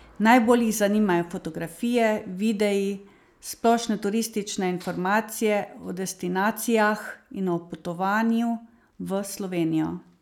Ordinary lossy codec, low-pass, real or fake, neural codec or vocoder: none; 19.8 kHz; real; none